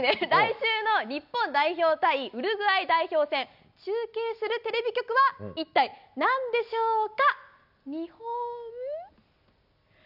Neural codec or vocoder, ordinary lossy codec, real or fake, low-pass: none; none; real; 5.4 kHz